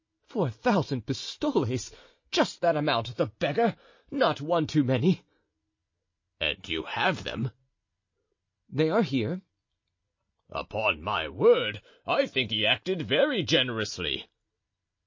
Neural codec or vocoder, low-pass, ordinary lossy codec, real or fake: none; 7.2 kHz; MP3, 32 kbps; real